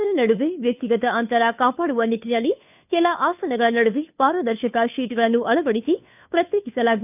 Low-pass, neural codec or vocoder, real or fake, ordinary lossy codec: 3.6 kHz; codec, 16 kHz, 2 kbps, FunCodec, trained on Chinese and English, 25 frames a second; fake; none